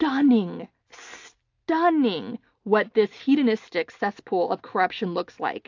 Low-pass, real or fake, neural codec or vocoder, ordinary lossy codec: 7.2 kHz; real; none; AAC, 48 kbps